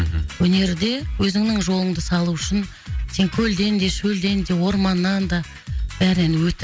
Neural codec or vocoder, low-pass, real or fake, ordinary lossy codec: none; none; real; none